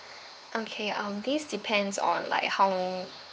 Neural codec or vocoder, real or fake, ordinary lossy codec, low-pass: codec, 16 kHz, 4 kbps, X-Codec, HuBERT features, trained on LibriSpeech; fake; none; none